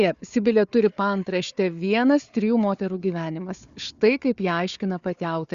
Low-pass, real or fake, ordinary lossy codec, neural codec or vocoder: 7.2 kHz; fake; Opus, 64 kbps; codec, 16 kHz, 4 kbps, FunCodec, trained on Chinese and English, 50 frames a second